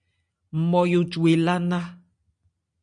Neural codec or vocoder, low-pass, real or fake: none; 9.9 kHz; real